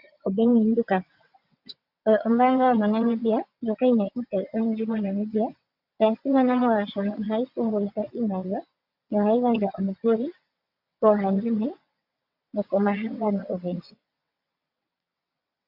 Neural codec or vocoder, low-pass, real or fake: vocoder, 22.05 kHz, 80 mel bands, WaveNeXt; 5.4 kHz; fake